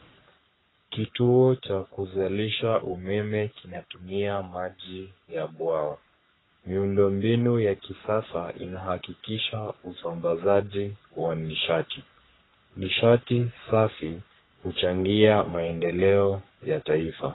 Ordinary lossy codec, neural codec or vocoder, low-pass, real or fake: AAC, 16 kbps; codec, 44.1 kHz, 3.4 kbps, Pupu-Codec; 7.2 kHz; fake